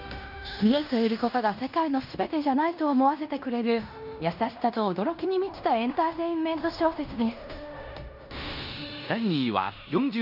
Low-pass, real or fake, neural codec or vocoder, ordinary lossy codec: 5.4 kHz; fake; codec, 16 kHz in and 24 kHz out, 0.9 kbps, LongCat-Audio-Codec, fine tuned four codebook decoder; none